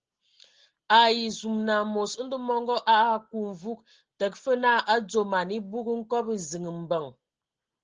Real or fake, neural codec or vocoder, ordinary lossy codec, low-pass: real; none; Opus, 16 kbps; 7.2 kHz